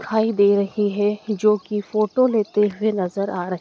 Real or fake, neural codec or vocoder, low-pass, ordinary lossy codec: real; none; none; none